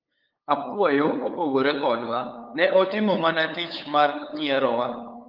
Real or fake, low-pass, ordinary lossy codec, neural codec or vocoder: fake; 5.4 kHz; Opus, 24 kbps; codec, 16 kHz, 8 kbps, FunCodec, trained on LibriTTS, 25 frames a second